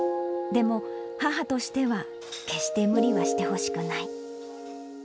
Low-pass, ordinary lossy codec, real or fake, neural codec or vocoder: none; none; real; none